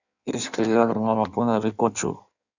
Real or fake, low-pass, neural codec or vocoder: fake; 7.2 kHz; codec, 16 kHz in and 24 kHz out, 1.1 kbps, FireRedTTS-2 codec